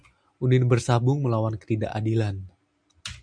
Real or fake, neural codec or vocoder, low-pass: real; none; 9.9 kHz